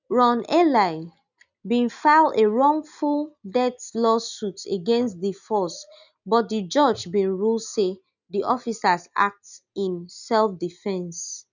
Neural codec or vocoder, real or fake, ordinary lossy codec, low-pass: none; real; none; 7.2 kHz